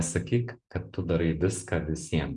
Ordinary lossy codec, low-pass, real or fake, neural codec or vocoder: MP3, 96 kbps; 10.8 kHz; fake; vocoder, 44.1 kHz, 128 mel bands every 512 samples, BigVGAN v2